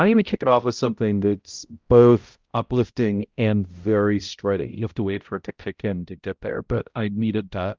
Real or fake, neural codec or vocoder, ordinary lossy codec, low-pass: fake; codec, 16 kHz, 0.5 kbps, X-Codec, HuBERT features, trained on balanced general audio; Opus, 24 kbps; 7.2 kHz